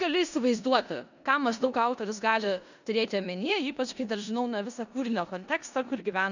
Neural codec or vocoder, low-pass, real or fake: codec, 16 kHz in and 24 kHz out, 0.9 kbps, LongCat-Audio-Codec, four codebook decoder; 7.2 kHz; fake